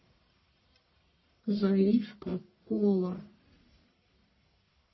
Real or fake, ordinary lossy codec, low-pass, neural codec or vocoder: fake; MP3, 24 kbps; 7.2 kHz; codec, 44.1 kHz, 1.7 kbps, Pupu-Codec